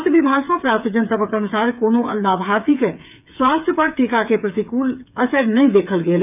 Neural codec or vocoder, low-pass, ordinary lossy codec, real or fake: codec, 16 kHz, 8 kbps, FreqCodec, smaller model; 3.6 kHz; none; fake